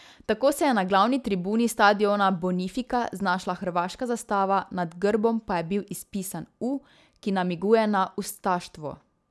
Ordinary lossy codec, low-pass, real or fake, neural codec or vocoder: none; none; real; none